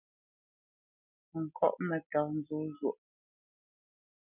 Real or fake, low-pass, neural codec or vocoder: real; 3.6 kHz; none